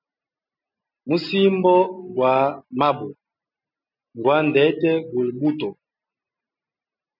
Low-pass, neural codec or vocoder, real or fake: 5.4 kHz; none; real